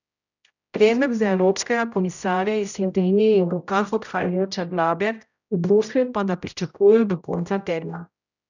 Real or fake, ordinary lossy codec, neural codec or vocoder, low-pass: fake; none; codec, 16 kHz, 0.5 kbps, X-Codec, HuBERT features, trained on general audio; 7.2 kHz